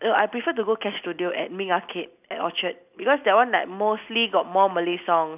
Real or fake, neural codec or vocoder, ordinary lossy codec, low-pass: real; none; none; 3.6 kHz